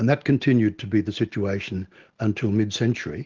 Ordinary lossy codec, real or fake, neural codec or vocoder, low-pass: Opus, 16 kbps; real; none; 7.2 kHz